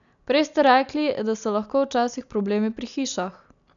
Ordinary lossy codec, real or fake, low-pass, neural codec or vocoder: none; real; 7.2 kHz; none